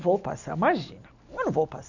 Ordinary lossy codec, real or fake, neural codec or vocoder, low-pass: none; real; none; 7.2 kHz